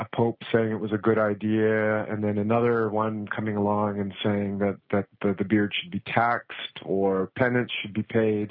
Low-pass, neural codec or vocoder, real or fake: 5.4 kHz; none; real